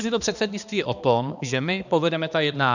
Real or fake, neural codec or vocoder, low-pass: fake; codec, 16 kHz, 2 kbps, X-Codec, HuBERT features, trained on balanced general audio; 7.2 kHz